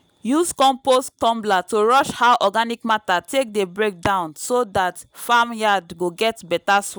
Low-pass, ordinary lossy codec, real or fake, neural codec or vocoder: none; none; real; none